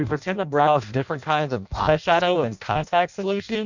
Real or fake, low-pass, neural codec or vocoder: fake; 7.2 kHz; codec, 16 kHz in and 24 kHz out, 0.6 kbps, FireRedTTS-2 codec